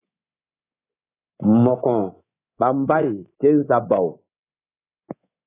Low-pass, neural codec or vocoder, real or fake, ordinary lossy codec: 3.6 kHz; codec, 24 kHz, 3.1 kbps, DualCodec; fake; AAC, 16 kbps